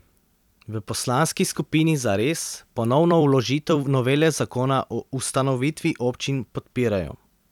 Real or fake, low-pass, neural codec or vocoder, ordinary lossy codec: fake; 19.8 kHz; vocoder, 44.1 kHz, 128 mel bands every 256 samples, BigVGAN v2; none